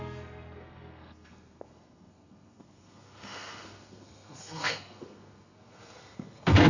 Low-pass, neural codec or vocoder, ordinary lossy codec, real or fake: 7.2 kHz; codec, 32 kHz, 1.9 kbps, SNAC; none; fake